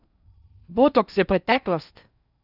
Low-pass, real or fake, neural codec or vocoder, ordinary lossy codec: 5.4 kHz; fake; codec, 16 kHz in and 24 kHz out, 0.6 kbps, FocalCodec, streaming, 4096 codes; none